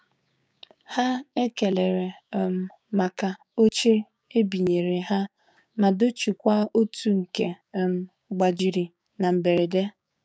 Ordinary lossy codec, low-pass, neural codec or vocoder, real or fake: none; none; codec, 16 kHz, 6 kbps, DAC; fake